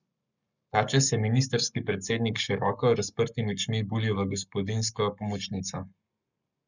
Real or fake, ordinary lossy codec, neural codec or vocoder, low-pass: fake; none; codec, 44.1 kHz, 7.8 kbps, Pupu-Codec; 7.2 kHz